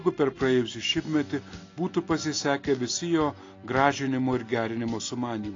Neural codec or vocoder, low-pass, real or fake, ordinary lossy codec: none; 7.2 kHz; real; AAC, 32 kbps